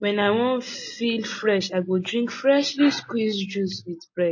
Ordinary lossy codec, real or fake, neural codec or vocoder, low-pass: MP3, 32 kbps; real; none; 7.2 kHz